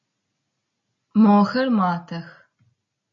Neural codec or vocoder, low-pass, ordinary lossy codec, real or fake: none; 7.2 kHz; MP3, 32 kbps; real